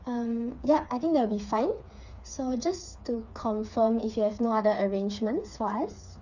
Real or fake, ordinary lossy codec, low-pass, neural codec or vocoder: fake; none; 7.2 kHz; codec, 16 kHz, 4 kbps, FreqCodec, smaller model